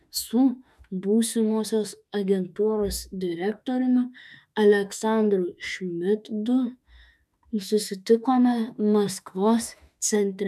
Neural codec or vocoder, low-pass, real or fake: autoencoder, 48 kHz, 32 numbers a frame, DAC-VAE, trained on Japanese speech; 14.4 kHz; fake